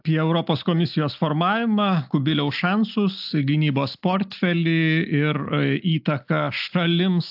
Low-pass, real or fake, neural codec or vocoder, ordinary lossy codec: 5.4 kHz; real; none; AAC, 48 kbps